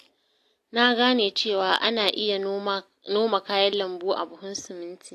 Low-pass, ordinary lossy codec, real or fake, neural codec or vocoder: 14.4 kHz; AAC, 64 kbps; real; none